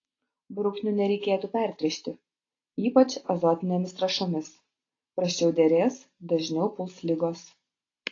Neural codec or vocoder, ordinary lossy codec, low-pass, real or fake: none; AAC, 32 kbps; 7.2 kHz; real